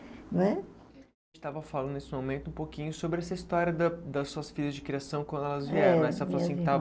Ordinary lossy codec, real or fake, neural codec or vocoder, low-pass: none; real; none; none